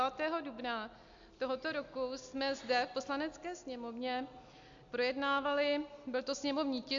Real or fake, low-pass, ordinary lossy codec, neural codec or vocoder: real; 7.2 kHz; AAC, 64 kbps; none